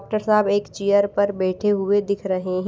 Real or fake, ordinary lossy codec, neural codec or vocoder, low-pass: real; none; none; none